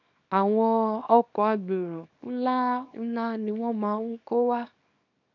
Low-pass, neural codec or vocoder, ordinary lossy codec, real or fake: 7.2 kHz; codec, 24 kHz, 0.9 kbps, WavTokenizer, small release; none; fake